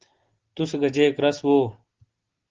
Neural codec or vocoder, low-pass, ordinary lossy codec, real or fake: none; 7.2 kHz; Opus, 16 kbps; real